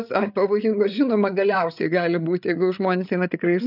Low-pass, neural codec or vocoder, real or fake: 5.4 kHz; codec, 16 kHz, 8 kbps, FreqCodec, larger model; fake